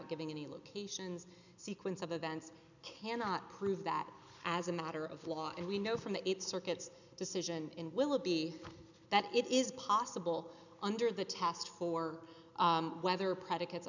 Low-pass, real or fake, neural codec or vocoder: 7.2 kHz; real; none